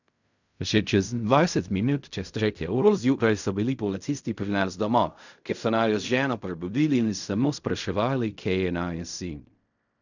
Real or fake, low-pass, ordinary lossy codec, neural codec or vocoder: fake; 7.2 kHz; none; codec, 16 kHz in and 24 kHz out, 0.4 kbps, LongCat-Audio-Codec, fine tuned four codebook decoder